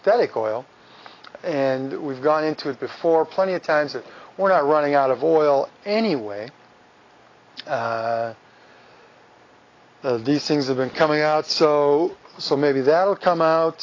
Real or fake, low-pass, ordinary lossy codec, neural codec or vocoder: real; 7.2 kHz; AAC, 32 kbps; none